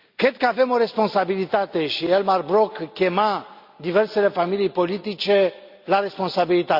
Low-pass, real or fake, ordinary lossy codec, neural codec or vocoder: 5.4 kHz; real; Opus, 64 kbps; none